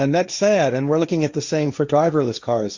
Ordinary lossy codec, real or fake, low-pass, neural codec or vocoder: Opus, 64 kbps; fake; 7.2 kHz; codec, 16 kHz, 1.1 kbps, Voila-Tokenizer